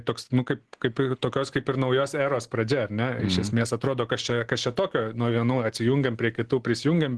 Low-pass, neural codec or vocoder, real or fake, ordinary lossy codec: 10.8 kHz; none; real; Opus, 16 kbps